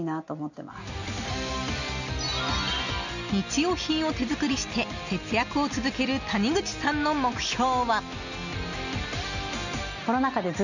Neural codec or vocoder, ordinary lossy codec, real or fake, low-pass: none; none; real; 7.2 kHz